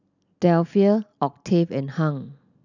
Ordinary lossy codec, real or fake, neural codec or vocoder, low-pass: none; real; none; 7.2 kHz